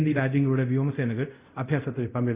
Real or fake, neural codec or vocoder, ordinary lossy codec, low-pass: fake; codec, 24 kHz, 0.5 kbps, DualCodec; Opus, 64 kbps; 3.6 kHz